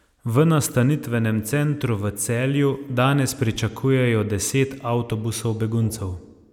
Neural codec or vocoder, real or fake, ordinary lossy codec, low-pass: none; real; none; 19.8 kHz